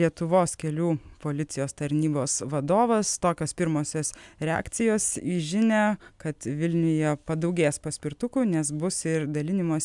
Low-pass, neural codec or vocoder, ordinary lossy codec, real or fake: 10.8 kHz; none; MP3, 96 kbps; real